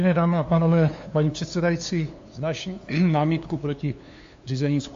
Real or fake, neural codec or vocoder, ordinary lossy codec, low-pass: fake; codec, 16 kHz, 2 kbps, FunCodec, trained on LibriTTS, 25 frames a second; AAC, 48 kbps; 7.2 kHz